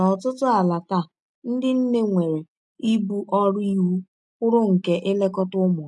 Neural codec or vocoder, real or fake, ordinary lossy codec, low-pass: none; real; none; 10.8 kHz